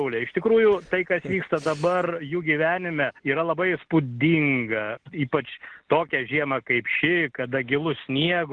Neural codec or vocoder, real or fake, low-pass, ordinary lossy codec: none; real; 9.9 kHz; Opus, 16 kbps